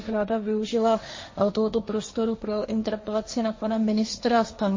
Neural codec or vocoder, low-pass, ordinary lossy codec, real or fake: codec, 16 kHz, 1.1 kbps, Voila-Tokenizer; 7.2 kHz; MP3, 32 kbps; fake